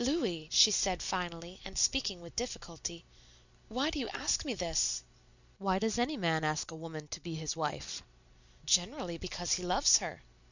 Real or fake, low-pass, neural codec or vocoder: real; 7.2 kHz; none